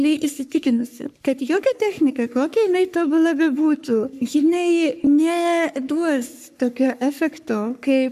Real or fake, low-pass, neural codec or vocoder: fake; 14.4 kHz; codec, 44.1 kHz, 3.4 kbps, Pupu-Codec